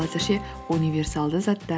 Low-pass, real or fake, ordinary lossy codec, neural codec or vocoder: none; real; none; none